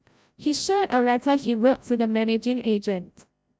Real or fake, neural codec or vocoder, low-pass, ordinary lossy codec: fake; codec, 16 kHz, 0.5 kbps, FreqCodec, larger model; none; none